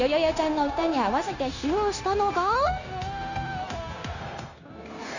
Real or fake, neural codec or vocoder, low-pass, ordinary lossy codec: fake; codec, 16 kHz, 0.9 kbps, LongCat-Audio-Codec; 7.2 kHz; none